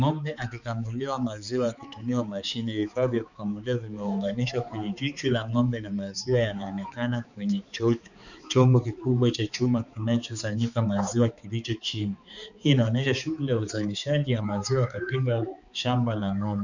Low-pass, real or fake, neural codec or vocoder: 7.2 kHz; fake; codec, 16 kHz, 4 kbps, X-Codec, HuBERT features, trained on balanced general audio